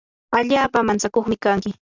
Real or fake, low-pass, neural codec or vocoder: real; 7.2 kHz; none